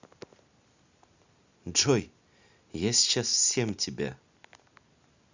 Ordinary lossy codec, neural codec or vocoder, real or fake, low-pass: Opus, 64 kbps; none; real; 7.2 kHz